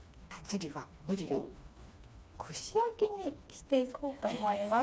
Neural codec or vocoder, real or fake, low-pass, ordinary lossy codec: codec, 16 kHz, 2 kbps, FreqCodec, smaller model; fake; none; none